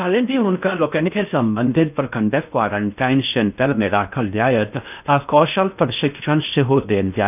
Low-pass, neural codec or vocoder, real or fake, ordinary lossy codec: 3.6 kHz; codec, 16 kHz in and 24 kHz out, 0.6 kbps, FocalCodec, streaming, 4096 codes; fake; none